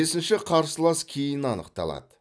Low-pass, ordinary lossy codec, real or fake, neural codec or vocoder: none; none; real; none